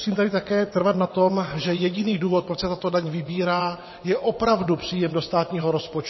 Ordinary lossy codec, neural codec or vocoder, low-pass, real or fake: MP3, 24 kbps; vocoder, 22.05 kHz, 80 mel bands, WaveNeXt; 7.2 kHz; fake